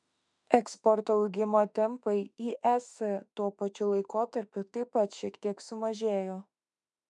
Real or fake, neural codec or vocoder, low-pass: fake; autoencoder, 48 kHz, 32 numbers a frame, DAC-VAE, trained on Japanese speech; 10.8 kHz